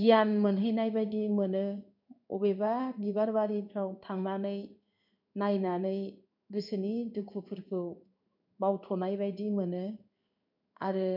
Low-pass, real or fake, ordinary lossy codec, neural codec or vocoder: 5.4 kHz; fake; none; codec, 16 kHz in and 24 kHz out, 1 kbps, XY-Tokenizer